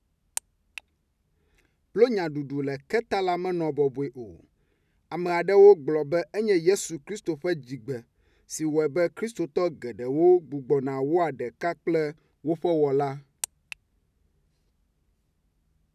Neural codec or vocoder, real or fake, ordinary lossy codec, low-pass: none; real; none; 14.4 kHz